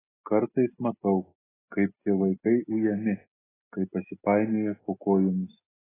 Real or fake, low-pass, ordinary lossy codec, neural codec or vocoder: real; 3.6 kHz; AAC, 16 kbps; none